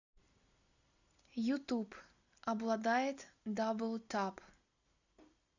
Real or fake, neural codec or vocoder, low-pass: real; none; 7.2 kHz